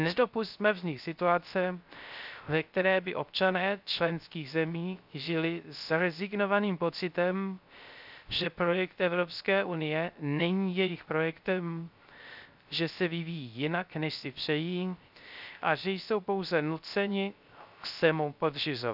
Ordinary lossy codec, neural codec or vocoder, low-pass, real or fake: none; codec, 16 kHz, 0.3 kbps, FocalCodec; 5.4 kHz; fake